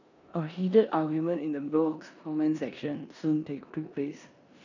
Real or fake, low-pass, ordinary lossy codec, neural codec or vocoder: fake; 7.2 kHz; none; codec, 16 kHz in and 24 kHz out, 0.9 kbps, LongCat-Audio-Codec, four codebook decoder